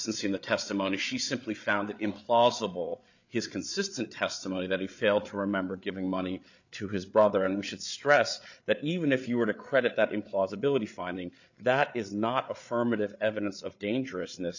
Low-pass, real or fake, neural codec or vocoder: 7.2 kHz; fake; codec, 16 kHz, 8 kbps, FreqCodec, larger model